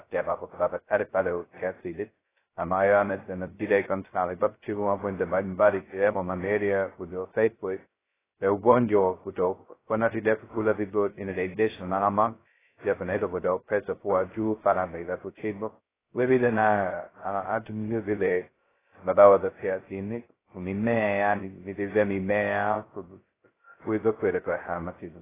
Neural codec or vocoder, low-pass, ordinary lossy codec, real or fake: codec, 16 kHz, 0.2 kbps, FocalCodec; 3.6 kHz; AAC, 16 kbps; fake